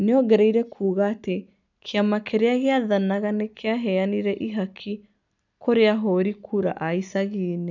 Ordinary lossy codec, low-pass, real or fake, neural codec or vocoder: none; 7.2 kHz; real; none